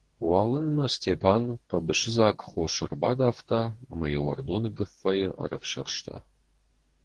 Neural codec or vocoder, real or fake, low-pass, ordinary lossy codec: codec, 44.1 kHz, 2.6 kbps, DAC; fake; 10.8 kHz; Opus, 16 kbps